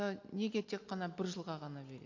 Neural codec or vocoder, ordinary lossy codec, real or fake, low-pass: none; AAC, 48 kbps; real; 7.2 kHz